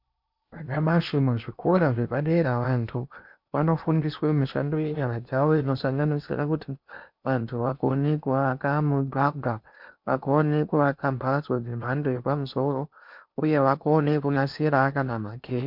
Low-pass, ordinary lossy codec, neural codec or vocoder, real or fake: 5.4 kHz; MP3, 48 kbps; codec, 16 kHz in and 24 kHz out, 0.8 kbps, FocalCodec, streaming, 65536 codes; fake